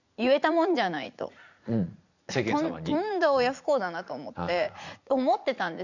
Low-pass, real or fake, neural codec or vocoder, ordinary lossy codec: 7.2 kHz; fake; vocoder, 44.1 kHz, 80 mel bands, Vocos; none